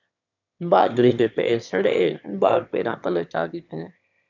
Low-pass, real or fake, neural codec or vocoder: 7.2 kHz; fake; autoencoder, 22.05 kHz, a latent of 192 numbers a frame, VITS, trained on one speaker